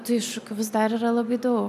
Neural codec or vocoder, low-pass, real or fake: none; 14.4 kHz; real